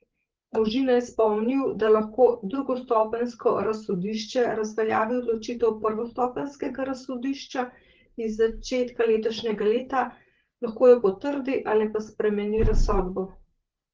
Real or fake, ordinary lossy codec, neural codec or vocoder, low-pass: fake; Opus, 16 kbps; codec, 16 kHz, 8 kbps, FreqCodec, larger model; 7.2 kHz